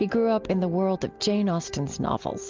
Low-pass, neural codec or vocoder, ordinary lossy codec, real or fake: 7.2 kHz; none; Opus, 24 kbps; real